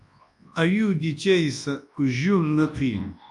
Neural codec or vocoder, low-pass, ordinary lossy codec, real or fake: codec, 24 kHz, 0.9 kbps, WavTokenizer, large speech release; 10.8 kHz; AAC, 64 kbps; fake